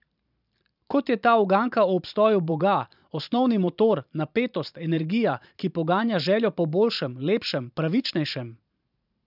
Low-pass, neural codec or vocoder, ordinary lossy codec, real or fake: 5.4 kHz; vocoder, 44.1 kHz, 128 mel bands every 512 samples, BigVGAN v2; none; fake